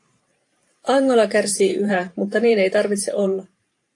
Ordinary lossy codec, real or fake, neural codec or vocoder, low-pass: AAC, 48 kbps; fake; vocoder, 44.1 kHz, 128 mel bands every 512 samples, BigVGAN v2; 10.8 kHz